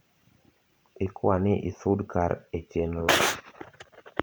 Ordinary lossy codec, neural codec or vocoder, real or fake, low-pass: none; vocoder, 44.1 kHz, 128 mel bands every 512 samples, BigVGAN v2; fake; none